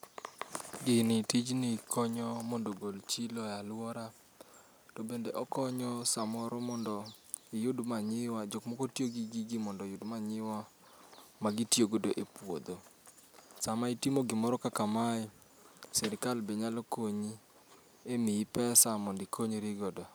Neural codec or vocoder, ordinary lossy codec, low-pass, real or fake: none; none; none; real